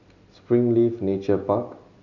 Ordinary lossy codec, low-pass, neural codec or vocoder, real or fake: none; 7.2 kHz; none; real